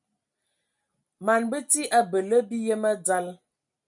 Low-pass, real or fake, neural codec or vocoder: 10.8 kHz; real; none